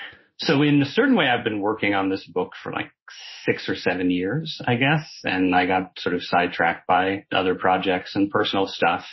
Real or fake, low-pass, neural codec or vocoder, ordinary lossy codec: fake; 7.2 kHz; codec, 16 kHz in and 24 kHz out, 1 kbps, XY-Tokenizer; MP3, 24 kbps